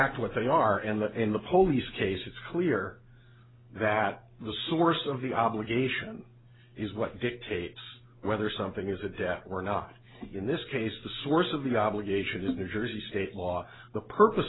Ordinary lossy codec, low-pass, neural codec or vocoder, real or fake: AAC, 16 kbps; 7.2 kHz; none; real